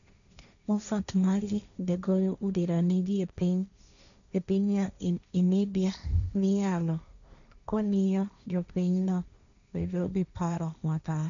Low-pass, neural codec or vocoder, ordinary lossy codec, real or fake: 7.2 kHz; codec, 16 kHz, 1.1 kbps, Voila-Tokenizer; none; fake